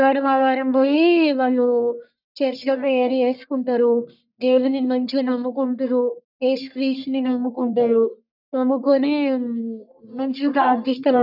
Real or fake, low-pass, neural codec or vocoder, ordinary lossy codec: fake; 5.4 kHz; codec, 44.1 kHz, 1.7 kbps, Pupu-Codec; none